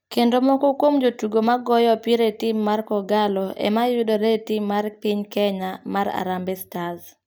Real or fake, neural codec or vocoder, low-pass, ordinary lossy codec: real; none; none; none